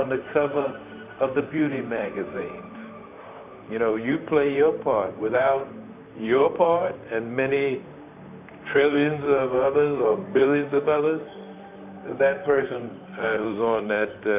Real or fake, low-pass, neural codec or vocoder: fake; 3.6 kHz; vocoder, 44.1 kHz, 128 mel bands, Pupu-Vocoder